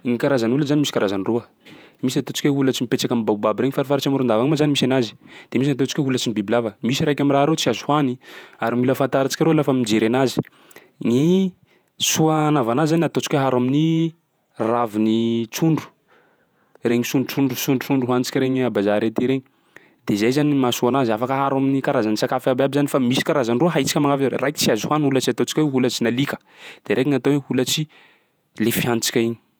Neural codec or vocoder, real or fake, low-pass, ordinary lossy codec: vocoder, 48 kHz, 128 mel bands, Vocos; fake; none; none